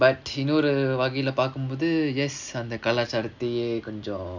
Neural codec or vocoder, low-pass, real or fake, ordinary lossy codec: none; 7.2 kHz; real; none